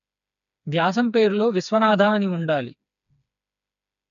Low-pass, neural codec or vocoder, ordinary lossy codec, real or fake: 7.2 kHz; codec, 16 kHz, 4 kbps, FreqCodec, smaller model; none; fake